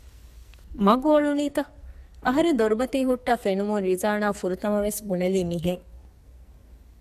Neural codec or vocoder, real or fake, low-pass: codec, 44.1 kHz, 2.6 kbps, SNAC; fake; 14.4 kHz